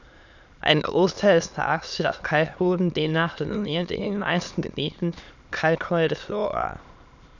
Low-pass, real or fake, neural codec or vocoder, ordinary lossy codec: 7.2 kHz; fake; autoencoder, 22.05 kHz, a latent of 192 numbers a frame, VITS, trained on many speakers; none